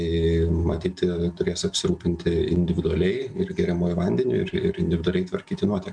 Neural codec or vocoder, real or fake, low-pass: none; real; 9.9 kHz